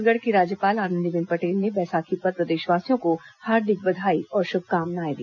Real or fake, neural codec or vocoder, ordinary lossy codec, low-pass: real; none; none; 7.2 kHz